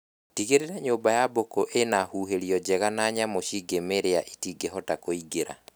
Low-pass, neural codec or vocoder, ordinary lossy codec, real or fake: none; none; none; real